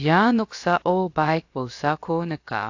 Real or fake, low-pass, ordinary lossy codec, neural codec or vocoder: fake; 7.2 kHz; AAC, 48 kbps; codec, 16 kHz, about 1 kbps, DyCAST, with the encoder's durations